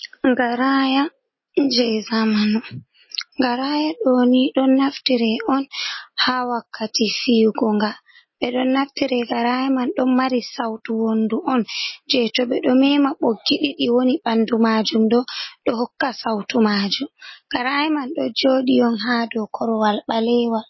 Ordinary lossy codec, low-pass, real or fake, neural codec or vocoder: MP3, 24 kbps; 7.2 kHz; real; none